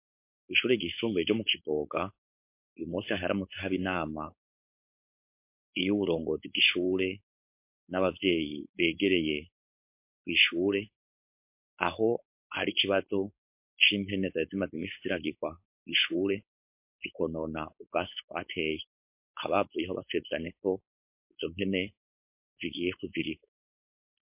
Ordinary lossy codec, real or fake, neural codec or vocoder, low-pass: MP3, 32 kbps; fake; codec, 16 kHz, 4.8 kbps, FACodec; 3.6 kHz